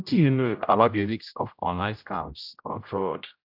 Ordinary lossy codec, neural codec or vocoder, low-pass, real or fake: AAC, 48 kbps; codec, 16 kHz, 0.5 kbps, X-Codec, HuBERT features, trained on general audio; 5.4 kHz; fake